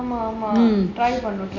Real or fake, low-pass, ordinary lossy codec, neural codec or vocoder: real; 7.2 kHz; none; none